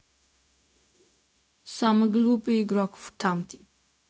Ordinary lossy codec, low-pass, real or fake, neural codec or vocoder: none; none; fake; codec, 16 kHz, 0.4 kbps, LongCat-Audio-Codec